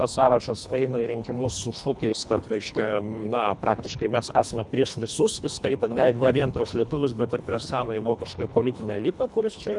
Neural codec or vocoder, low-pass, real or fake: codec, 24 kHz, 1.5 kbps, HILCodec; 10.8 kHz; fake